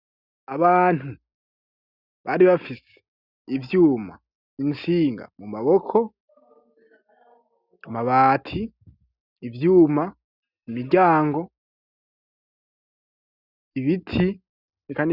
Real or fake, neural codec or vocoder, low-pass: real; none; 5.4 kHz